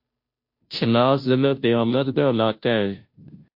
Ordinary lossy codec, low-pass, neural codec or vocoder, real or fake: MP3, 32 kbps; 5.4 kHz; codec, 16 kHz, 0.5 kbps, FunCodec, trained on Chinese and English, 25 frames a second; fake